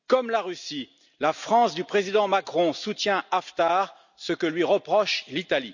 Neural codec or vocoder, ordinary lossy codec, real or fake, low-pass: none; none; real; 7.2 kHz